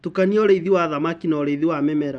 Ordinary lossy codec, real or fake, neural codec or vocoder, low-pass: none; real; none; 10.8 kHz